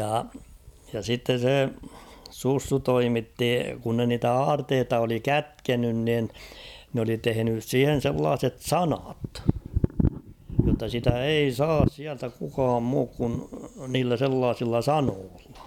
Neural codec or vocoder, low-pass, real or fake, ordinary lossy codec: none; 19.8 kHz; real; none